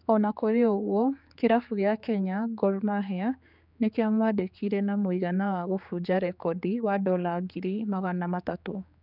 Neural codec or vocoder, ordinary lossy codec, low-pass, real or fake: codec, 16 kHz, 4 kbps, X-Codec, HuBERT features, trained on general audio; none; 5.4 kHz; fake